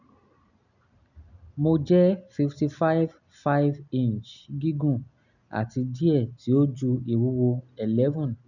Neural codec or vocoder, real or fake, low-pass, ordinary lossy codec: none; real; 7.2 kHz; none